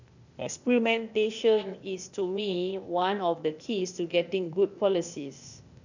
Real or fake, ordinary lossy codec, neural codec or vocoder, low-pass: fake; none; codec, 16 kHz, 0.8 kbps, ZipCodec; 7.2 kHz